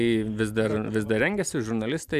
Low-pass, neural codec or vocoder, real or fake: 14.4 kHz; vocoder, 44.1 kHz, 128 mel bands every 512 samples, BigVGAN v2; fake